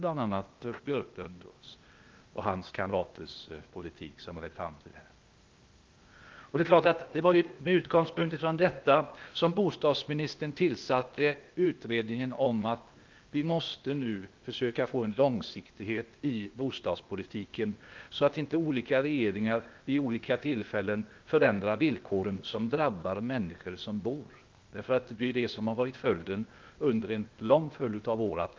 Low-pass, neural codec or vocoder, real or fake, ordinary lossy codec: 7.2 kHz; codec, 16 kHz, 0.8 kbps, ZipCodec; fake; Opus, 32 kbps